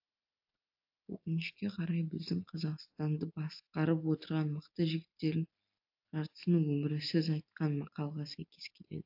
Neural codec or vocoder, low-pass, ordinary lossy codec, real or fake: none; 5.4 kHz; AAC, 48 kbps; real